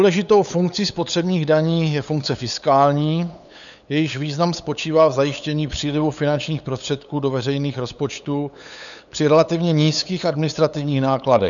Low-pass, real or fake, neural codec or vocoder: 7.2 kHz; fake; codec, 16 kHz, 8 kbps, FunCodec, trained on LibriTTS, 25 frames a second